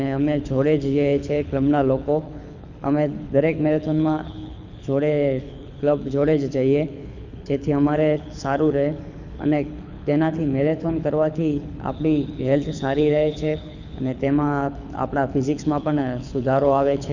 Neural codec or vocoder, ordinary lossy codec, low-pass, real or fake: codec, 24 kHz, 6 kbps, HILCodec; none; 7.2 kHz; fake